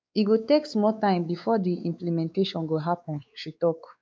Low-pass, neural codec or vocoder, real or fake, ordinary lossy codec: none; codec, 16 kHz, 4 kbps, X-Codec, WavLM features, trained on Multilingual LibriSpeech; fake; none